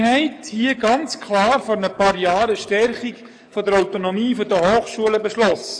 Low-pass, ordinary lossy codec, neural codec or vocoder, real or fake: 9.9 kHz; AAC, 64 kbps; codec, 44.1 kHz, 7.8 kbps, DAC; fake